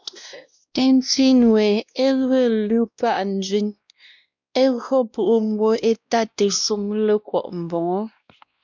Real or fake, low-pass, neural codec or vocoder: fake; 7.2 kHz; codec, 16 kHz, 1 kbps, X-Codec, WavLM features, trained on Multilingual LibriSpeech